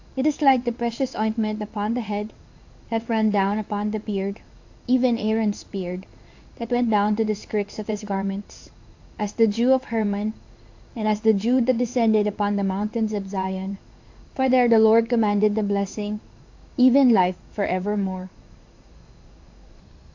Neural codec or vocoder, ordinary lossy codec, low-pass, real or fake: codec, 16 kHz in and 24 kHz out, 1 kbps, XY-Tokenizer; AAC, 48 kbps; 7.2 kHz; fake